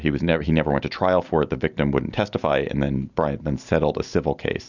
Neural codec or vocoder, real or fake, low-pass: none; real; 7.2 kHz